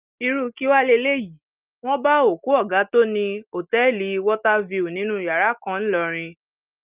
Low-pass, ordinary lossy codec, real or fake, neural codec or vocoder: 3.6 kHz; Opus, 24 kbps; real; none